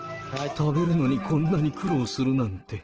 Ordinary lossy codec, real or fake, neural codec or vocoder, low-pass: Opus, 16 kbps; real; none; 7.2 kHz